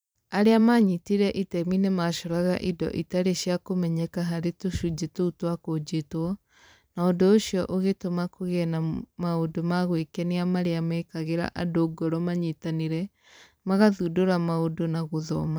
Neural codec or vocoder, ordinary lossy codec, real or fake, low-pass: vocoder, 44.1 kHz, 128 mel bands every 512 samples, BigVGAN v2; none; fake; none